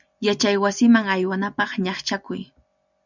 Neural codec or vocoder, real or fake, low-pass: none; real; 7.2 kHz